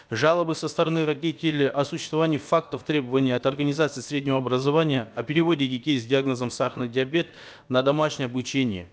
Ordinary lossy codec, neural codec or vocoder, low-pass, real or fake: none; codec, 16 kHz, about 1 kbps, DyCAST, with the encoder's durations; none; fake